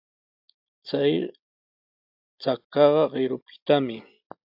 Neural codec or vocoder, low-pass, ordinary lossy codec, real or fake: none; 5.4 kHz; AAC, 48 kbps; real